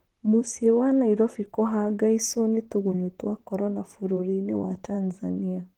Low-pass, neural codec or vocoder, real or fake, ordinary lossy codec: 19.8 kHz; vocoder, 44.1 kHz, 128 mel bands, Pupu-Vocoder; fake; Opus, 16 kbps